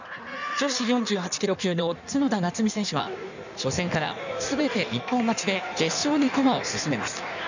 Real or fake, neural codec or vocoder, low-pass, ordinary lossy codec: fake; codec, 16 kHz in and 24 kHz out, 1.1 kbps, FireRedTTS-2 codec; 7.2 kHz; none